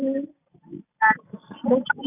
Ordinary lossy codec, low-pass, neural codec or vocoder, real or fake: none; 3.6 kHz; none; real